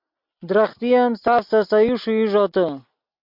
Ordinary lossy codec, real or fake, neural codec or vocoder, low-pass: MP3, 48 kbps; real; none; 5.4 kHz